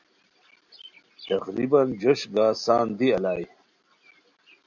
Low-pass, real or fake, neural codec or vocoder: 7.2 kHz; real; none